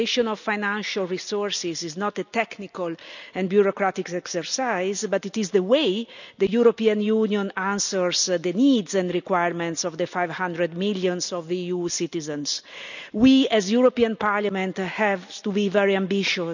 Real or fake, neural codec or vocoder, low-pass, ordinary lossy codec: real; none; 7.2 kHz; none